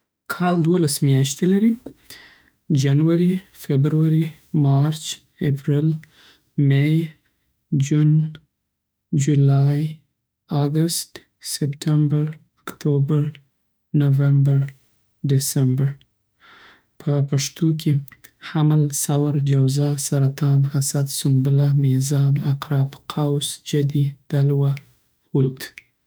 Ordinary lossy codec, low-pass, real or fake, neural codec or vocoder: none; none; fake; autoencoder, 48 kHz, 32 numbers a frame, DAC-VAE, trained on Japanese speech